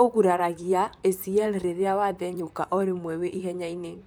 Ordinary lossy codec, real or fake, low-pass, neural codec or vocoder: none; fake; none; vocoder, 44.1 kHz, 128 mel bands, Pupu-Vocoder